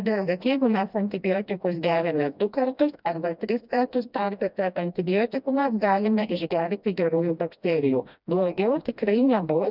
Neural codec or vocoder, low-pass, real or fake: codec, 16 kHz, 1 kbps, FreqCodec, smaller model; 5.4 kHz; fake